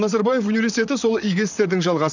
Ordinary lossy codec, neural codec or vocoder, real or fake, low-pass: none; vocoder, 44.1 kHz, 128 mel bands, Pupu-Vocoder; fake; 7.2 kHz